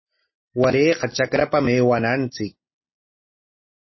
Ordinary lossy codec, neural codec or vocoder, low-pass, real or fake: MP3, 24 kbps; none; 7.2 kHz; real